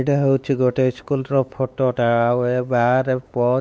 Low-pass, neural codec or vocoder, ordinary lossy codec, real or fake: none; codec, 16 kHz, 4 kbps, X-Codec, WavLM features, trained on Multilingual LibriSpeech; none; fake